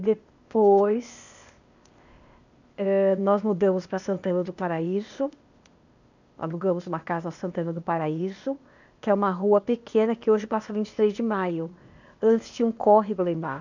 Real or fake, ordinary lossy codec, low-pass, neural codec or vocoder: fake; none; 7.2 kHz; codec, 16 kHz, 0.8 kbps, ZipCodec